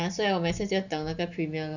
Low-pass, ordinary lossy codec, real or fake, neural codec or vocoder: 7.2 kHz; none; real; none